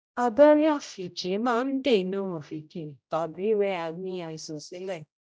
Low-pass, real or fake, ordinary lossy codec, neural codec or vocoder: none; fake; none; codec, 16 kHz, 0.5 kbps, X-Codec, HuBERT features, trained on general audio